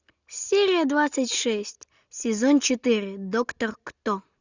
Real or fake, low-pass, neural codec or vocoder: real; 7.2 kHz; none